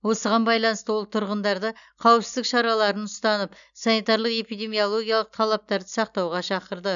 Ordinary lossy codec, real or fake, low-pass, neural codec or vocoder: none; real; 7.2 kHz; none